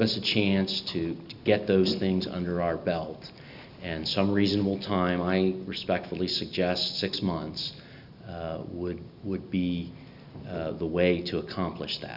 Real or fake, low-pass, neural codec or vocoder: real; 5.4 kHz; none